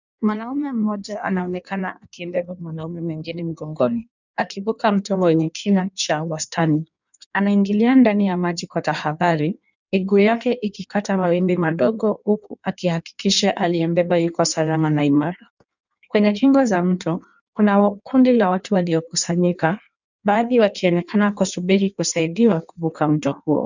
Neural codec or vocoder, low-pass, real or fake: codec, 16 kHz in and 24 kHz out, 1.1 kbps, FireRedTTS-2 codec; 7.2 kHz; fake